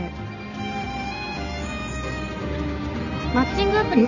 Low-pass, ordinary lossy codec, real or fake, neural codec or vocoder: 7.2 kHz; none; real; none